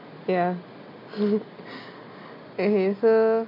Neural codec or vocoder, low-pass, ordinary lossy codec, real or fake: none; 5.4 kHz; MP3, 32 kbps; real